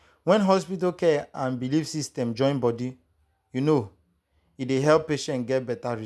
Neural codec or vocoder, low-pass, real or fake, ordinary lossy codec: none; none; real; none